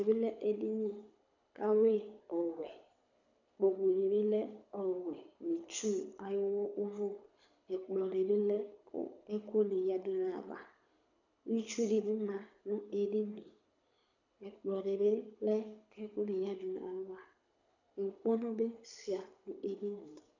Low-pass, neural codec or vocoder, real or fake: 7.2 kHz; codec, 24 kHz, 6 kbps, HILCodec; fake